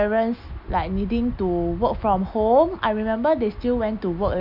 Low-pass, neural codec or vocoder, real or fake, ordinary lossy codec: 5.4 kHz; none; real; none